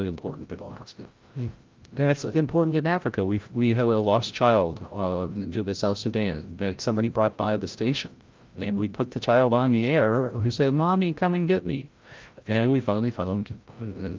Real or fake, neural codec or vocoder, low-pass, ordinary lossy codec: fake; codec, 16 kHz, 0.5 kbps, FreqCodec, larger model; 7.2 kHz; Opus, 32 kbps